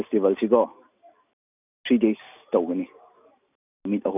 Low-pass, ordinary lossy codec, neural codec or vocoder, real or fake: 3.6 kHz; none; none; real